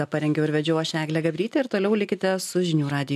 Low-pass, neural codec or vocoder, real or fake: 14.4 kHz; none; real